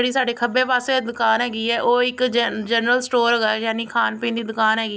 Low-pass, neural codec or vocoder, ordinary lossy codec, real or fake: none; none; none; real